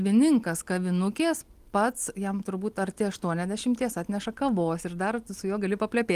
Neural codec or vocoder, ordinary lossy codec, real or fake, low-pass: none; Opus, 24 kbps; real; 14.4 kHz